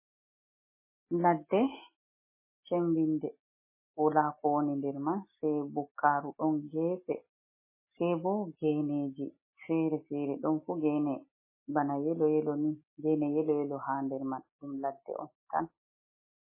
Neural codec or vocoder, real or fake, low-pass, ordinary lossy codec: none; real; 3.6 kHz; MP3, 16 kbps